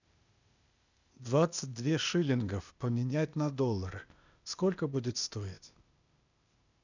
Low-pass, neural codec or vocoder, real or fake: 7.2 kHz; codec, 16 kHz, 0.8 kbps, ZipCodec; fake